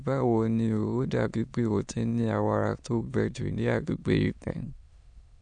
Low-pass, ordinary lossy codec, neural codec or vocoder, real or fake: 9.9 kHz; none; autoencoder, 22.05 kHz, a latent of 192 numbers a frame, VITS, trained on many speakers; fake